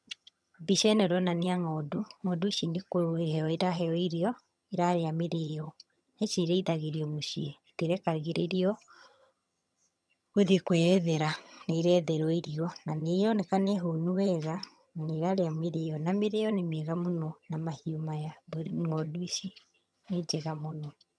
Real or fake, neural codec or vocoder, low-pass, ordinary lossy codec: fake; vocoder, 22.05 kHz, 80 mel bands, HiFi-GAN; none; none